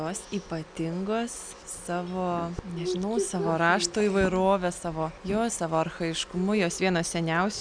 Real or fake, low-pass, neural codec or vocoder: real; 9.9 kHz; none